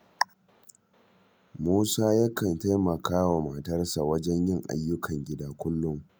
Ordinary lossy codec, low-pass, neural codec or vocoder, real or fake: none; none; none; real